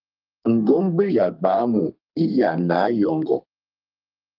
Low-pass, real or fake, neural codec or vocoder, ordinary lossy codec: 5.4 kHz; fake; codec, 32 kHz, 1.9 kbps, SNAC; Opus, 24 kbps